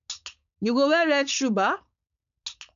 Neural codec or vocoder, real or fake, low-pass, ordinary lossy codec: codec, 16 kHz, 4.8 kbps, FACodec; fake; 7.2 kHz; none